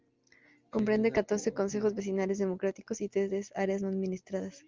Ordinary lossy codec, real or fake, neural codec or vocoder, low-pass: Opus, 32 kbps; real; none; 7.2 kHz